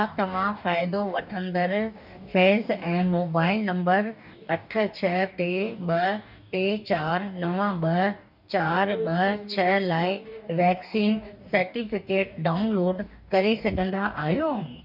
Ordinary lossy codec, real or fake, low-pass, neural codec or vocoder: none; fake; 5.4 kHz; codec, 44.1 kHz, 2.6 kbps, DAC